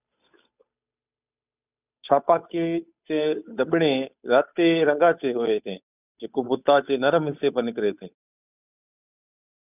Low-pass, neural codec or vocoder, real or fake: 3.6 kHz; codec, 16 kHz, 8 kbps, FunCodec, trained on Chinese and English, 25 frames a second; fake